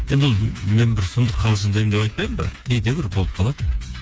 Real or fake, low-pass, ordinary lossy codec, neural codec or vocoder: fake; none; none; codec, 16 kHz, 4 kbps, FreqCodec, smaller model